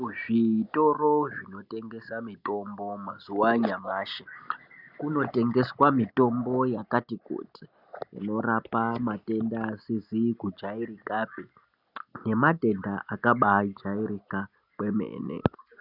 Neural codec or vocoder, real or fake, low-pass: none; real; 5.4 kHz